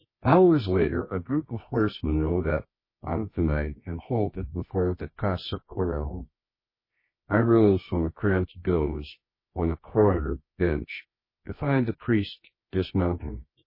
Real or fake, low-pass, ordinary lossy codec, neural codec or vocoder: fake; 5.4 kHz; MP3, 24 kbps; codec, 24 kHz, 0.9 kbps, WavTokenizer, medium music audio release